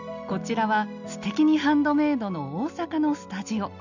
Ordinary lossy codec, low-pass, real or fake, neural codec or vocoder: none; 7.2 kHz; real; none